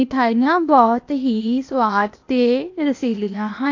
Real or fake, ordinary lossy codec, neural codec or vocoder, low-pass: fake; AAC, 48 kbps; codec, 16 kHz, 0.8 kbps, ZipCodec; 7.2 kHz